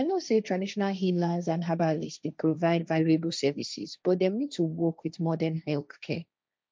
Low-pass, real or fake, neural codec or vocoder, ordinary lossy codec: none; fake; codec, 16 kHz, 1.1 kbps, Voila-Tokenizer; none